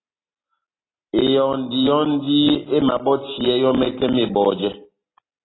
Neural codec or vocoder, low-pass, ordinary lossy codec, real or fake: none; 7.2 kHz; AAC, 16 kbps; real